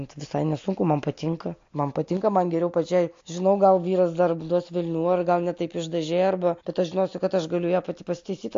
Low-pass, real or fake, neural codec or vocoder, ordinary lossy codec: 7.2 kHz; real; none; AAC, 48 kbps